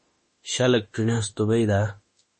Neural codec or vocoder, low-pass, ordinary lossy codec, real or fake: autoencoder, 48 kHz, 32 numbers a frame, DAC-VAE, trained on Japanese speech; 10.8 kHz; MP3, 32 kbps; fake